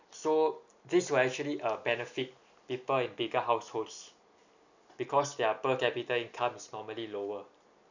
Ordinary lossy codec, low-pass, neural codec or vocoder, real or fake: none; 7.2 kHz; none; real